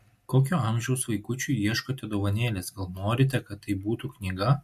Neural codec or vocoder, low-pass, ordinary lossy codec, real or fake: none; 14.4 kHz; MP3, 64 kbps; real